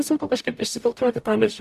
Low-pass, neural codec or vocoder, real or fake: 14.4 kHz; codec, 44.1 kHz, 0.9 kbps, DAC; fake